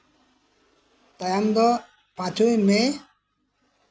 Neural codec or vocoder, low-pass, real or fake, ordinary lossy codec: none; none; real; none